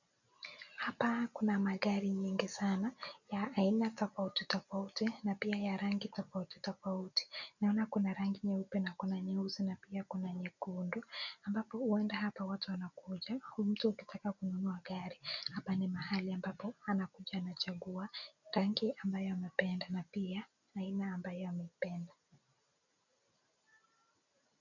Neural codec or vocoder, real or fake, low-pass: none; real; 7.2 kHz